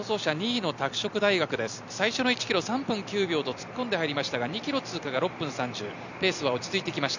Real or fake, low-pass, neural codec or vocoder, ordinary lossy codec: fake; 7.2 kHz; vocoder, 44.1 kHz, 128 mel bands every 256 samples, BigVGAN v2; none